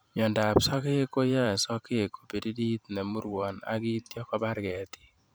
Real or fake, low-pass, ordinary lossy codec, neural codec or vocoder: fake; none; none; vocoder, 44.1 kHz, 128 mel bands every 512 samples, BigVGAN v2